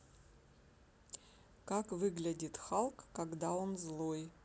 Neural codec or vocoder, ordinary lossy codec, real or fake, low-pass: none; none; real; none